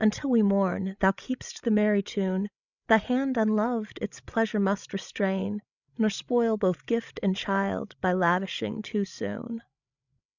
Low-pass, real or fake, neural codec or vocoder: 7.2 kHz; fake; codec, 16 kHz, 16 kbps, FreqCodec, larger model